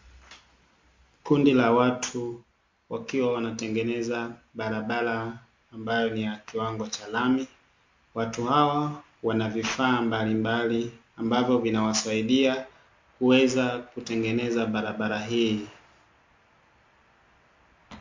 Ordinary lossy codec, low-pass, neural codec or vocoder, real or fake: MP3, 48 kbps; 7.2 kHz; none; real